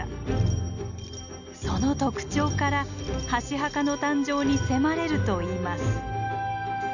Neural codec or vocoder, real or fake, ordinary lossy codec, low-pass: none; real; none; 7.2 kHz